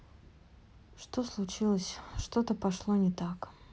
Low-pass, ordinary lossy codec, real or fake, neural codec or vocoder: none; none; real; none